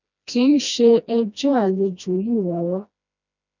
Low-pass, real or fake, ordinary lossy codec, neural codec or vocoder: 7.2 kHz; fake; none; codec, 16 kHz, 1 kbps, FreqCodec, smaller model